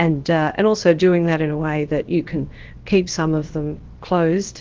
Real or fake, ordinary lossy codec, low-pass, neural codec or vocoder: fake; Opus, 16 kbps; 7.2 kHz; codec, 16 kHz, about 1 kbps, DyCAST, with the encoder's durations